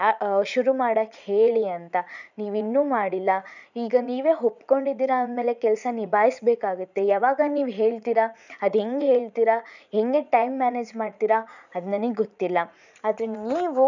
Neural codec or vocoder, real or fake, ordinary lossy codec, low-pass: vocoder, 44.1 kHz, 80 mel bands, Vocos; fake; none; 7.2 kHz